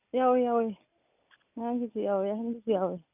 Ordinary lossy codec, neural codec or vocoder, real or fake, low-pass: none; none; real; 3.6 kHz